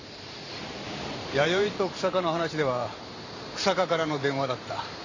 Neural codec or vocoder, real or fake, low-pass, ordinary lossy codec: vocoder, 44.1 kHz, 128 mel bands every 512 samples, BigVGAN v2; fake; 7.2 kHz; AAC, 48 kbps